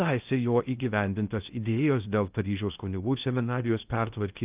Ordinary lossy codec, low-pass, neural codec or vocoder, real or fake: Opus, 24 kbps; 3.6 kHz; codec, 16 kHz in and 24 kHz out, 0.6 kbps, FocalCodec, streaming, 2048 codes; fake